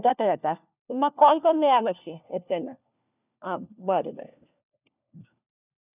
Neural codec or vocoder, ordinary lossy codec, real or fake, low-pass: codec, 16 kHz, 1 kbps, FunCodec, trained on LibriTTS, 50 frames a second; none; fake; 3.6 kHz